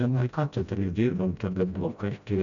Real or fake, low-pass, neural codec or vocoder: fake; 7.2 kHz; codec, 16 kHz, 0.5 kbps, FreqCodec, smaller model